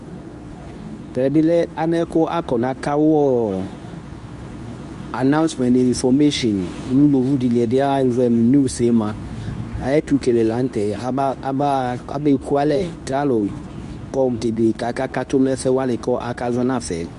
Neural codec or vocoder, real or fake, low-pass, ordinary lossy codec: codec, 24 kHz, 0.9 kbps, WavTokenizer, medium speech release version 2; fake; 10.8 kHz; Opus, 64 kbps